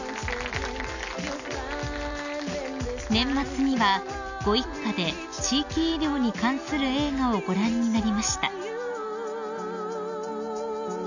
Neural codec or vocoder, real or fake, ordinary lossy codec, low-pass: none; real; none; 7.2 kHz